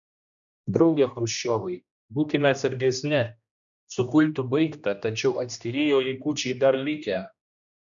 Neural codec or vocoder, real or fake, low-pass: codec, 16 kHz, 1 kbps, X-Codec, HuBERT features, trained on general audio; fake; 7.2 kHz